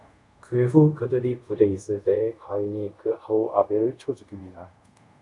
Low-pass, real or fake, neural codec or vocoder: 10.8 kHz; fake; codec, 24 kHz, 0.5 kbps, DualCodec